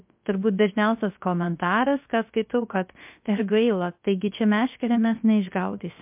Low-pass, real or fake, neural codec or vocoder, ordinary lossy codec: 3.6 kHz; fake; codec, 16 kHz, 0.3 kbps, FocalCodec; MP3, 32 kbps